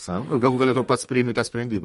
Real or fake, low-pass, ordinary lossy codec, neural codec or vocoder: fake; 14.4 kHz; MP3, 48 kbps; codec, 32 kHz, 1.9 kbps, SNAC